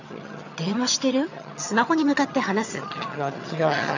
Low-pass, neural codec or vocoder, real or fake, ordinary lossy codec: 7.2 kHz; vocoder, 22.05 kHz, 80 mel bands, HiFi-GAN; fake; none